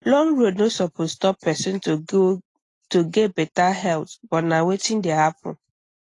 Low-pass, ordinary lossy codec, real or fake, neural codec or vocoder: 10.8 kHz; AAC, 32 kbps; real; none